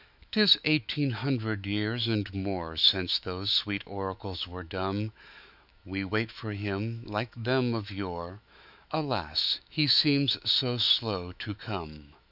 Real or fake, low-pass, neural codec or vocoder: fake; 5.4 kHz; autoencoder, 48 kHz, 128 numbers a frame, DAC-VAE, trained on Japanese speech